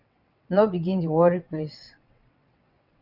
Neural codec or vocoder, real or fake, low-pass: vocoder, 22.05 kHz, 80 mel bands, WaveNeXt; fake; 5.4 kHz